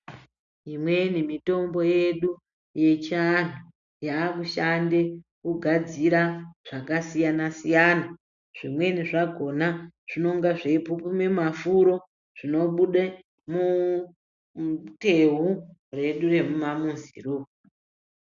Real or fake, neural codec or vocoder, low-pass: real; none; 7.2 kHz